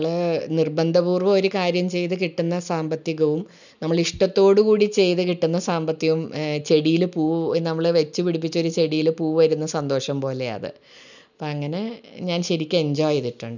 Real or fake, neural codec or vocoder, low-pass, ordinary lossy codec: real; none; 7.2 kHz; none